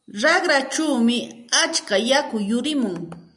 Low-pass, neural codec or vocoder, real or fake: 10.8 kHz; none; real